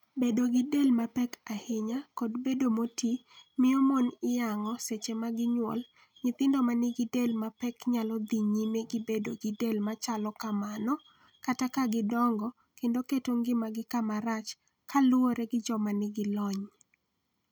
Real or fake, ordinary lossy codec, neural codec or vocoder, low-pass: real; none; none; 19.8 kHz